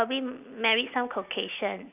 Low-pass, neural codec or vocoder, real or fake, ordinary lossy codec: 3.6 kHz; none; real; none